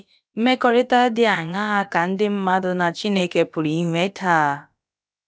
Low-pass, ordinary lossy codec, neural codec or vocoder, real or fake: none; none; codec, 16 kHz, about 1 kbps, DyCAST, with the encoder's durations; fake